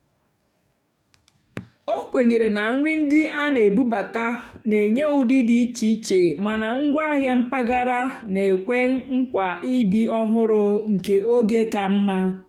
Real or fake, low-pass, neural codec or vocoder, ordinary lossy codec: fake; 19.8 kHz; codec, 44.1 kHz, 2.6 kbps, DAC; none